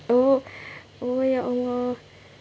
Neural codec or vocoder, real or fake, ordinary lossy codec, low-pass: none; real; none; none